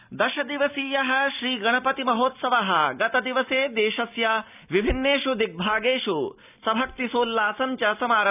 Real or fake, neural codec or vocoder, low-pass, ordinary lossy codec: real; none; 3.6 kHz; none